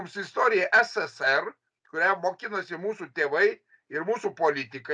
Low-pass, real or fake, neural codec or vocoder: 9.9 kHz; real; none